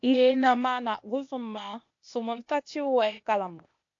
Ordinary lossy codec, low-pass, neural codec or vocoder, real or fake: AAC, 48 kbps; 7.2 kHz; codec, 16 kHz, 0.8 kbps, ZipCodec; fake